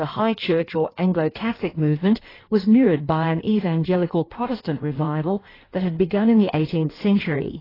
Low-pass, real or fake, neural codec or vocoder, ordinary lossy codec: 5.4 kHz; fake; codec, 16 kHz in and 24 kHz out, 1.1 kbps, FireRedTTS-2 codec; AAC, 24 kbps